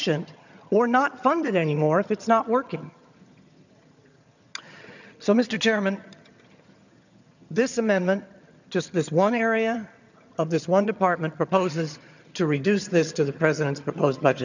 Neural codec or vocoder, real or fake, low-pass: vocoder, 22.05 kHz, 80 mel bands, HiFi-GAN; fake; 7.2 kHz